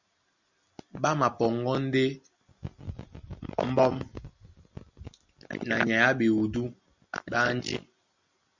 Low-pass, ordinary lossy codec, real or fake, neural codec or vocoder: 7.2 kHz; Opus, 64 kbps; real; none